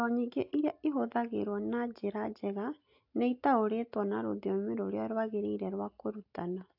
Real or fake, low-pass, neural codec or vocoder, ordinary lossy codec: real; 5.4 kHz; none; AAC, 48 kbps